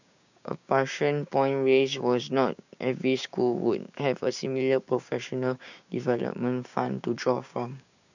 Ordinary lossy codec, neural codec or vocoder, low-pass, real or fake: none; codec, 16 kHz, 6 kbps, DAC; 7.2 kHz; fake